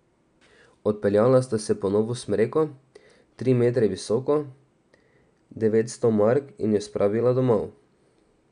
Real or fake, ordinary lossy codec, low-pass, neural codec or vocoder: real; none; 9.9 kHz; none